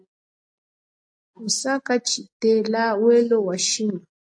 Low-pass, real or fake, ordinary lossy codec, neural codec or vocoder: 9.9 kHz; real; AAC, 48 kbps; none